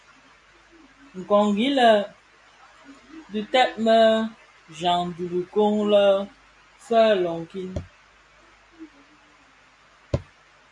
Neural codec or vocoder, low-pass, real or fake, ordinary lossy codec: none; 10.8 kHz; real; MP3, 64 kbps